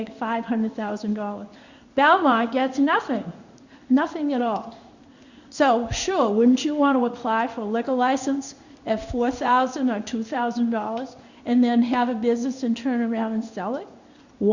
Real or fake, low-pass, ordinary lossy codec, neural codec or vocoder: fake; 7.2 kHz; Opus, 64 kbps; codec, 16 kHz in and 24 kHz out, 1 kbps, XY-Tokenizer